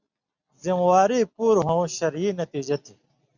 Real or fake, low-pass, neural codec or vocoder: real; 7.2 kHz; none